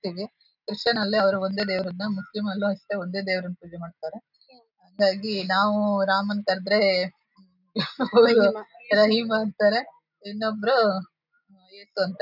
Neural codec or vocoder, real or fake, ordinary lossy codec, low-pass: none; real; none; 5.4 kHz